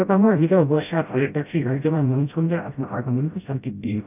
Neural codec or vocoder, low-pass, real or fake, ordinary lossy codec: codec, 16 kHz, 0.5 kbps, FreqCodec, smaller model; 3.6 kHz; fake; none